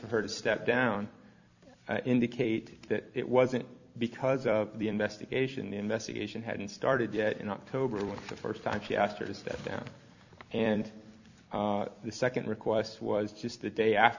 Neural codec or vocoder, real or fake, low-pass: vocoder, 44.1 kHz, 128 mel bands every 256 samples, BigVGAN v2; fake; 7.2 kHz